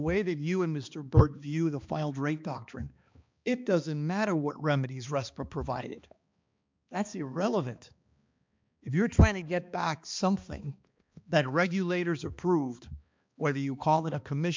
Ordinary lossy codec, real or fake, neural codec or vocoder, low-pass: MP3, 64 kbps; fake; codec, 16 kHz, 2 kbps, X-Codec, HuBERT features, trained on balanced general audio; 7.2 kHz